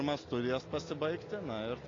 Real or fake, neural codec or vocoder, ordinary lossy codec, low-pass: real; none; AAC, 32 kbps; 7.2 kHz